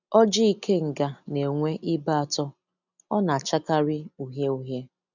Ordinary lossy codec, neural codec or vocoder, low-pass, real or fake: none; none; 7.2 kHz; real